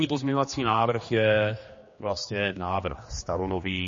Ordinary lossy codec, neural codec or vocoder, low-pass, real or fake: MP3, 32 kbps; codec, 16 kHz, 2 kbps, X-Codec, HuBERT features, trained on general audio; 7.2 kHz; fake